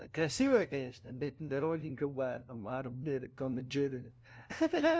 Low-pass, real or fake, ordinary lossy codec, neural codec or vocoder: none; fake; none; codec, 16 kHz, 0.5 kbps, FunCodec, trained on LibriTTS, 25 frames a second